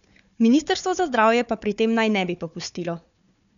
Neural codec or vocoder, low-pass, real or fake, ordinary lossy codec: codec, 16 kHz, 4 kbps, FunCodec, trained on Chinese and English, 50 frames a second; 7.2 kHz; fake; none